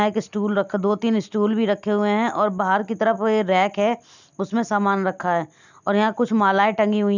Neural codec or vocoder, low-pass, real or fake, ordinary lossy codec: none; 7.2 kHz; real; none